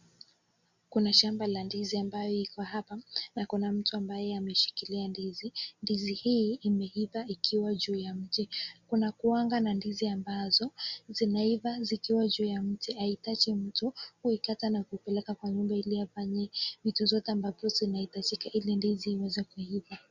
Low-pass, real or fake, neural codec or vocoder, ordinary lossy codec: 7.2 kHz; real; none; Opus, 64 kbps